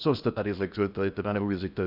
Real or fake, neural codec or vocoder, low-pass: fake; codec, 16 kHz in and 24 kHz out, 0.6 kbps, FocalCodec, streaming, 4096 codes; 5.4 kHz